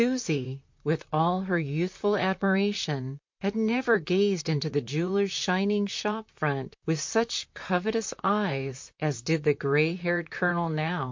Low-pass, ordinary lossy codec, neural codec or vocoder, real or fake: 7.2 kHz; MP3, 48 kbps; vocoder, 44.1 kHz, 128 mel bands, Pupu-Vocoder; fake